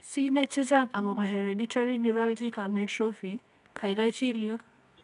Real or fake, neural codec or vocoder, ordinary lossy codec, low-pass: fake; codec, 24 kHz, 0.9 kbps, WavTokenizer, medium music audio release; none; 10.8 kHz